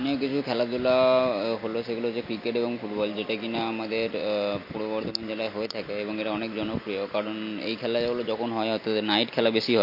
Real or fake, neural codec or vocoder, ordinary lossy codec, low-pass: real; none; MP3, 32 kbps; 5.4 kHz